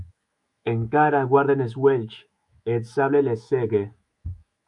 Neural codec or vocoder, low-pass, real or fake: autoencoder, 48 kHz, 128 numbers a frame, DAC-VAE, trained on Japanese speech; 10.8 kHz; fake